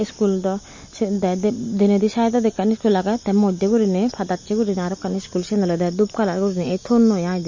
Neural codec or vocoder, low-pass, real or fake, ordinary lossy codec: none; 7.2 kHz; real; MP3, 32 kbps